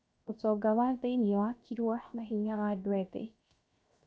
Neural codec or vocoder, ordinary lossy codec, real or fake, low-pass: codec, 16 kHz, 0.7 kbps, FocalCodec; none; fake; none